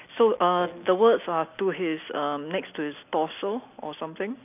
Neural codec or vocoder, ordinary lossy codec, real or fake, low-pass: none; none; real; 3.6 kHz